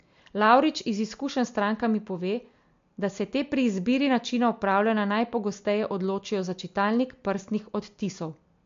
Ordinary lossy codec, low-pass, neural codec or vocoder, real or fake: MP3, 48 kbps; 7.2 kHz; none; real